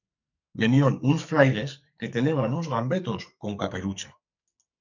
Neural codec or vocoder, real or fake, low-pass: codec, 44.1 kHz, 2.6 kbps, SNAC; fake; 7.2 kHz